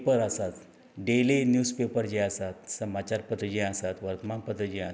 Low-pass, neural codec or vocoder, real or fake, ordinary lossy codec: none; none; real; none